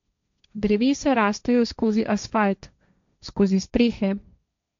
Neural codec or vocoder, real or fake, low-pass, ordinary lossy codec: codec, 16 kHz, 1.1 kbps, Voila-Tokenizer; fake; 7.2 kHz; MP3, 64 kbps